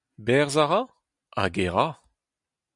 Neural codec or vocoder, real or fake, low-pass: none; real; 10.8 kHz